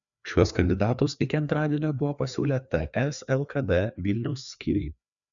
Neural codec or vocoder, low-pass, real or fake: codec, 16 kHz, 2 kbps, FreqCodec, larger model; 7.2 kHz; fake